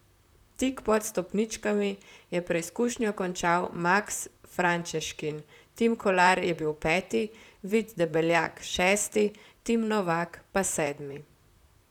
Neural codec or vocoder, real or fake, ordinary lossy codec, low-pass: vocoder, 44.1 kHz, 128 mel bands, Pupu-Vocoder; fake; none; 19.8 kHz